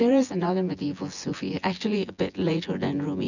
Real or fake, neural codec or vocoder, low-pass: fake; vocoder, 24 kHz, 100 mel bands, Vocos; 7.2 kHz